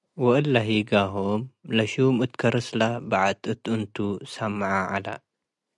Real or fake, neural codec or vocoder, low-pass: real; none; 10.8 kHz